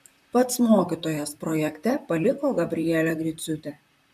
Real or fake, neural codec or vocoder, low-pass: fake; vocoder, 44.1 kHz, 128 mel bands, Pupu-Vocoder; 14.4 kHz